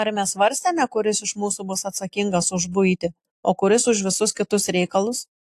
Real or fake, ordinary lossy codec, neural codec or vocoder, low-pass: real; AAC, 64 kbps; none; 14.4 kHz